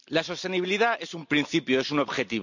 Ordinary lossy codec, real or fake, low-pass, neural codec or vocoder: none; real; 7.2 kHz; none